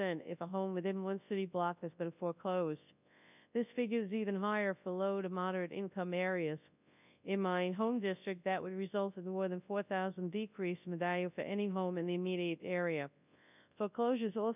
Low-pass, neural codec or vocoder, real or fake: 3.6 kHz; codec, 24 kHz, 0.9 kbps, WavTokenizer, large speech release; fake